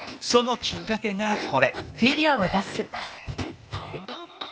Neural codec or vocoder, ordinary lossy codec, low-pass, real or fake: codec, 16 kHz, 0.8 kbps, ZipCodec; none; none; fake